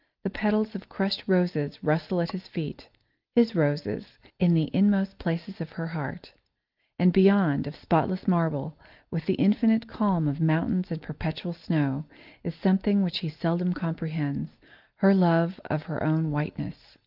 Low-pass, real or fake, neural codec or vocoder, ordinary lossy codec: 5.4 kHz; real; none; Opus, 32 kbps